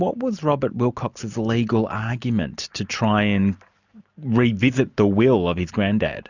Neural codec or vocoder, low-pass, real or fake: none; 7.2 kHz; real